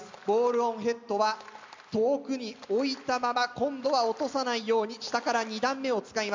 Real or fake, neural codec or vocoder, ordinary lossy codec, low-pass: real; none; MP3, 64 kbps; 7.2 kHz